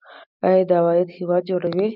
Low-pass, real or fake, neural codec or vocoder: 5.4 kHz; real; none